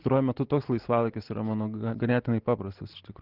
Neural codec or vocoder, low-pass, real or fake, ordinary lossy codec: none; 5.4 kHz; real; Opus, 16 kbps